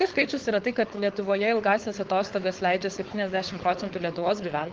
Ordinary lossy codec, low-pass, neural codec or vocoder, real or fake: Opus, 16 kbps; 7.2 kHz; codec, 16 kHz, 4.8 kbps, FACodec; fake